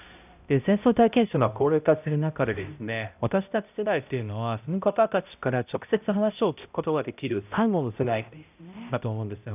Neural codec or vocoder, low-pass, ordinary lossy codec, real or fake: codec, 16 kHz, 0.5 kbps, X-Codec, HuBERT features, trained on balanced general audio; 3.6 kHz; none; fake